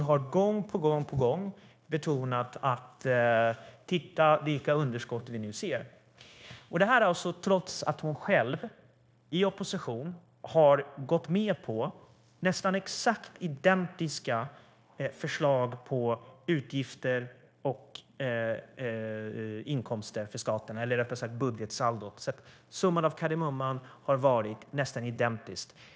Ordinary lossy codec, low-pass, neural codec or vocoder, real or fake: none; none; codec, 16 kHz, 0.9 kbps, LongCat-Audio-Codec; fake